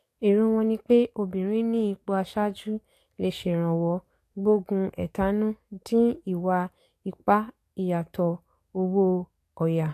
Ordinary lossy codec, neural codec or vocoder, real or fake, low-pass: AAC, 64 kbps; codec, 44.1 kHz, 7.8 kbps, DAC; fake; 14.4 kHz